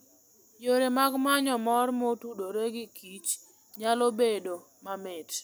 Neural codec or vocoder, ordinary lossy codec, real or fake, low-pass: none; none; real; none